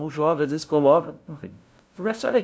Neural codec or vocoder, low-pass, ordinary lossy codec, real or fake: codec, 16 kHz, 0.5 kbps, FunCodec, trained on LibriTTS, 25 frames a second; none; none; fake